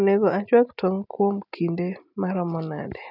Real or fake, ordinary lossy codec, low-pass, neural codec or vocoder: real; none; 5.4 kHz; none